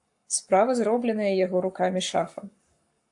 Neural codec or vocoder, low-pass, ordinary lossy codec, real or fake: codec, 44.1 kHz, 7.8 kbps, Pupu-Codec; 10.8 kHz; AAC, 64 kbps; fake